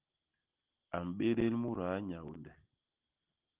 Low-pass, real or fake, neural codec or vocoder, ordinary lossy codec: 3.6 kHz; real; none; Opus, 32 kbps